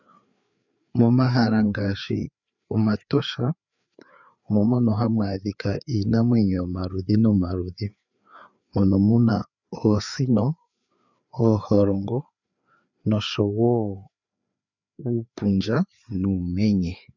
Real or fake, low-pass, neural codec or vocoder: fake; 7.2 kHz; codec, 16 kHz, 4 kbps, FreqCodec, larger model